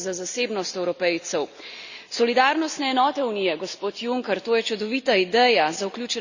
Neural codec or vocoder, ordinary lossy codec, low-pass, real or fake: none; Opus, 64 kbps; 7.2 kHz; real